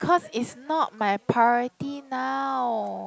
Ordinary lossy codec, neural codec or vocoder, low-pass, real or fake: none; none; none; real